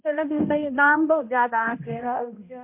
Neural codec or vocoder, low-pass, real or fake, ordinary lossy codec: codec, 16 kHz, 0.5 kbps, X-Codec, HuBERT features, trained on balanced general audio; 3.6 kHz; fake; MP3, 32 kbps